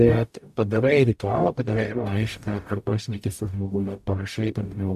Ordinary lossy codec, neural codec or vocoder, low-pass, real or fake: AAC, 96 kbps; codec, 44.1 kHz, 0.9 kbps, DAC; 14.4 kHz; fake